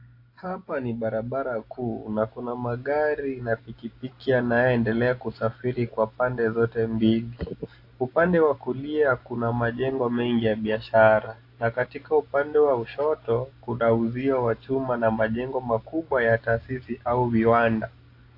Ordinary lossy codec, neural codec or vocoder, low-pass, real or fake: AAC, 32 kbps; none; 5.4 kHz; real